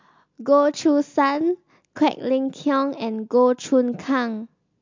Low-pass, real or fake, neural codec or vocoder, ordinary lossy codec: 7.2 kHz; real; none; MP3, 64 kbps